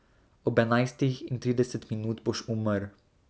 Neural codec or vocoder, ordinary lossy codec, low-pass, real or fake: none; none; none; real